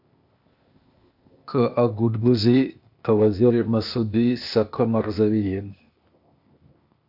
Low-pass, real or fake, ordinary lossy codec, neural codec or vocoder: 5.4 kHz; fake; AAC, 48 kbps; codec, 16 kHz, 0.8 kbps, ZipCodec